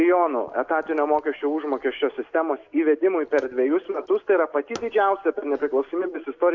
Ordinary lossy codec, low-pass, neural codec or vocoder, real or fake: AAC, 48 kbps; 7.2 kHz; none; real